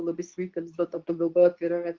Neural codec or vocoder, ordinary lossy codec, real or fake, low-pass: codec, 24 kHz, 0.9 kbps, WavTokenizer, medium speech release version 2; Opus, 24 kbps; fake; 7.2 kHz